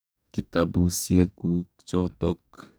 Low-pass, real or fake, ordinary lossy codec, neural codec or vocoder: none; fake; none; codec, 44.1 kHz, 2.6 kbps, DAC